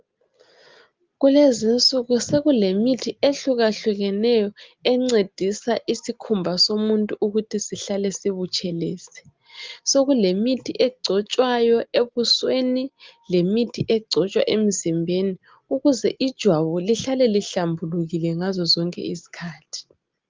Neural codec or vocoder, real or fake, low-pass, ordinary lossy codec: none; real; 7.2 kHz; Opus, 32 kbps